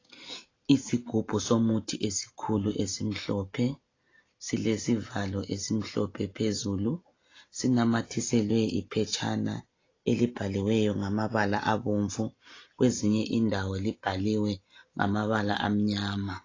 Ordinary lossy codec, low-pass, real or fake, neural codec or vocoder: AAC, 32 kbps; 7.2 kHz; real; none